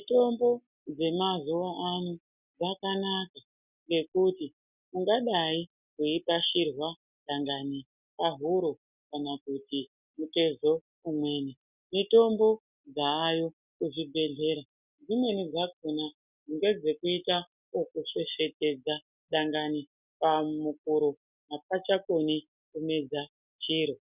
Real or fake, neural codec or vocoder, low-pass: real; none; 3.6 kHz